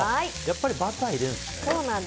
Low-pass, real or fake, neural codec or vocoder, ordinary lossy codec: none; real; none; none